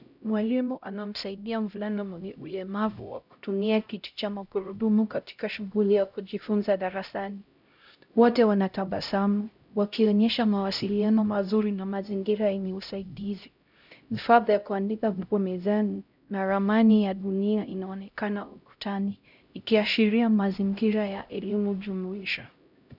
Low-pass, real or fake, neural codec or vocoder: 5.4 kHz; fake; codec, 16 kHz, 0.5 kbps, X-Codec, HuBERT features, trained on LibriSpeech